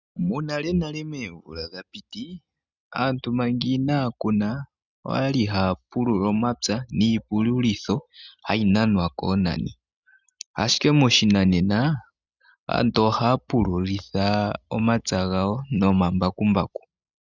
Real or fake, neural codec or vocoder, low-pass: real; none; 7.2 kHz